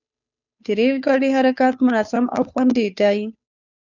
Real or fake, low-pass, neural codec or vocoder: fake; 7.2 kHz; codec, 16 kHz, 2 kbps, FunCodec, trained on Chinese and English, 25 frames a second